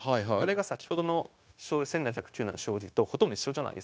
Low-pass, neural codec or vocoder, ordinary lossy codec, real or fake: none; codec, 16 kHz, 0.9 kbps, LongCat-Audio-Codec; none; fake